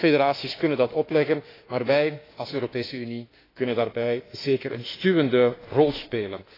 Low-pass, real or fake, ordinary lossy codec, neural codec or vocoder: 5.4 kHz; fake; AAC, 24 kbps; autoencoder, 48 kHz, 32 numbers a frame, DAC-VAE, trained on Japanese speech